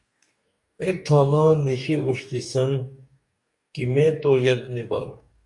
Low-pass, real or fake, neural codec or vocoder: 10.8 kHz; fake; codec, 44.1 kHz, 2.6 kbps, DAC